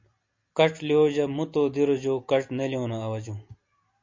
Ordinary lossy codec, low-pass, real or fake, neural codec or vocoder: MP3, 48 kbps; 7.2 kHz; real; none